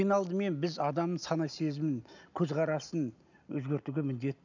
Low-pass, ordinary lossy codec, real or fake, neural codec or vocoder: 7.2 kHz; none; real; none